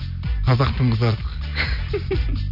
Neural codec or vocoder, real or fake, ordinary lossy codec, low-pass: none; real; none; 5.4 kHz